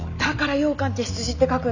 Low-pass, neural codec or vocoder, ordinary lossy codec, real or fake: 7.2 kHz; none; none; real